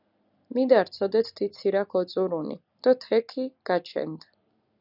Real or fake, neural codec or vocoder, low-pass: real; none; 5.4 kHz